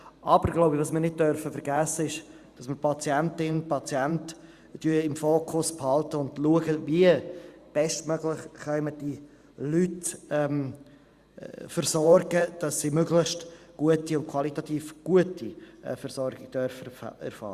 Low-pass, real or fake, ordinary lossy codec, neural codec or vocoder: 14.4 kHz; fake; Opus, 64 kbps; vocoder, 44.1 kHz, 128 mel bands every 512 samples, BigVGAN v2